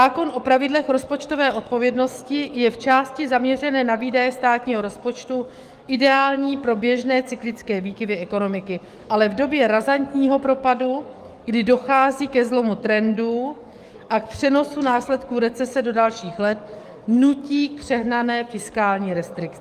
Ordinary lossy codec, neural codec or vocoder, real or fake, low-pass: Opus, 32 kbps; codec, 44.1 kHz, 7.8 kbps, DAC; fake; 14.4 kHz